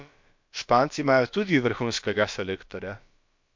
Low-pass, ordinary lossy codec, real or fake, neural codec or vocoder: 7.2 kHz; MP3, 48 kbps; fake; codec, 16 kHz, about 1 kbps, DyCAST, with the encoder's durations